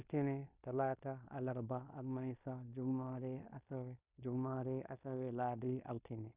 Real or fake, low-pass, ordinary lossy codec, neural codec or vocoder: fake; 3.6 kHz; Opus, 64 kbps; codec, 16 kHz in and 24 kHz out, 0.9 kbps, LongCat-Audio-Codec, fine tuned four codebook decoder